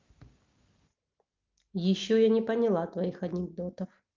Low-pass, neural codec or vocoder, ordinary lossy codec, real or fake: 7.2 kHz; none; Opus, 24 kbps; real